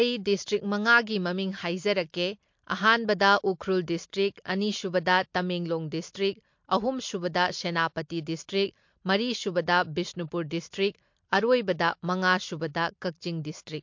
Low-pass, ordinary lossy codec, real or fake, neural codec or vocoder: 7.2 kHz; MP3, 48 kbps; real; none